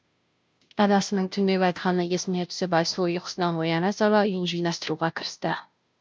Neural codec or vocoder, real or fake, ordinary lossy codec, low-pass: codec, 16 kHz, 0.5 kbps, FunCodec, trained on Chinese and English, 25 frames a second; fake; none; none